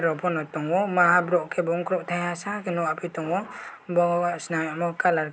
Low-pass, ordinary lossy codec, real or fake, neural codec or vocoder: none; none; real; none